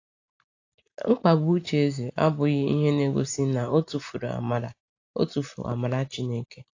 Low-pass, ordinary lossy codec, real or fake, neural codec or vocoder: 7.2 kHz; AAC, 48 kbps; real; none